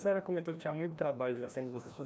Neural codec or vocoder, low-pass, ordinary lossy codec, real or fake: codec, 16 kHz, 1 kbps, FreqCodec, larger model; none; none; fake